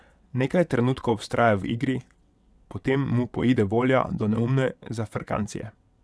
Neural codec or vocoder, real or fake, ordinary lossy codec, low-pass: vocoder, 22.05 kHz, 80 mel bands, WaveNeXt; fake; none; none